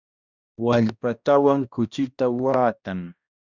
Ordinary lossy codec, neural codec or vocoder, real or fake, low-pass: Opus, 64 kbps; codec, 16 kHz, 1 kbps, X-Codec, HuBERT features, trained on balanced general audio; fake; 7.2 kHz